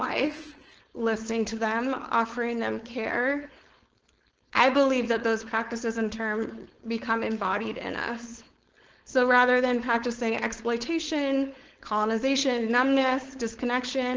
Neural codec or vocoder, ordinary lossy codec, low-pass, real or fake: codec, 16 kHz, 4.8 kbps, FACodec; Opus, 16 kbps; 7.2 kHz; fake